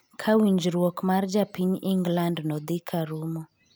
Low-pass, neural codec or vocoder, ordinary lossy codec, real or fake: none; none; none; real